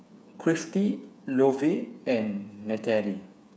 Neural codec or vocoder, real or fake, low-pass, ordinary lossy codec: codec, 16 kHz, 8 kbps, FreqCodec, smaller model; fake; none; none